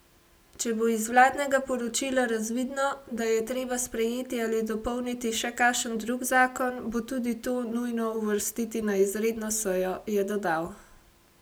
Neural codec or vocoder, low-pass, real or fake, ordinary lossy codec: none; none; real; none